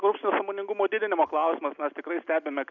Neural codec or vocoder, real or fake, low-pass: none; real; 7.2 kHz